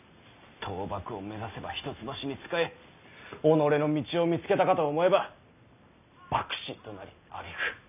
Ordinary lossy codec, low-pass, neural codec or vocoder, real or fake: none; 3.6 kHz; none; real